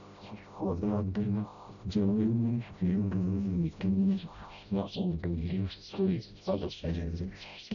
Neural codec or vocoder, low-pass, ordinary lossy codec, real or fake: codec, 16 kHz, 0.5 kbps, FreqCodec, smaller model; 7.2 kHz; none; fake